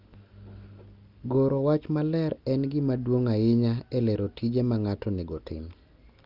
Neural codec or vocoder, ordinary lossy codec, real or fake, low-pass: none; Opus, 24 kbps; real; 5.4 kHz